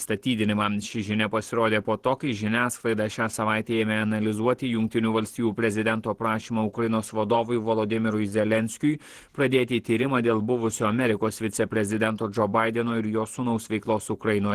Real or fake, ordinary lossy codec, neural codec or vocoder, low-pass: fake; Opus, 16 kbps; vocoder, 48 kHz, 128 mel bands, Vocos; 14.4 kHz